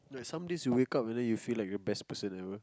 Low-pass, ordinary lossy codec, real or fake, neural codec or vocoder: none; none; real; none